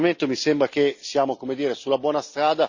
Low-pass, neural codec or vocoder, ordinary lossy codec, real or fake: 7.2 kHz; none; Opus, 64 kbps; real